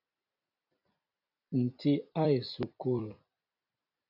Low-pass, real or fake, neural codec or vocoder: 5.4 kHz; fake; vocoder, 44.1 kHz, 128 mel bands every 256 samples, BigVGAN v2